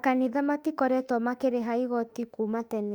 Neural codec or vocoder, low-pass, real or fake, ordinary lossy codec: autoencoder, 48 kHz, 32 numbers a frame, DAC-VAE, trained on Japanese speech; 19.8 kHz; fake; Opus, 64 kbps